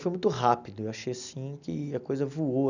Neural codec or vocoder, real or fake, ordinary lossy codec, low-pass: none; real; none; 7.2 kHz